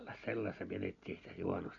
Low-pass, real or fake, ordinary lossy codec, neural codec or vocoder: 7.2 kHz; real; none; none